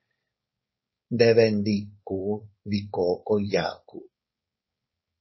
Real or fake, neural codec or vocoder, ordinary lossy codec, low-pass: fake; codec, 16 kHz, 4.8 kbps, FACodec; MP3, 24 kbps; 7.2 kHz